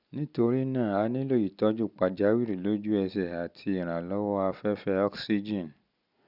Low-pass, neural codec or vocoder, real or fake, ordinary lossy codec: 5.4 kHz; none; real; none